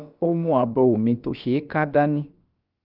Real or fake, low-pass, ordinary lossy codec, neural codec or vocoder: fake; 5.4 kHz; Opus, 32 kbps; codec, 16 kHz, about 1 kbps, DyCAST, with the encoder's durations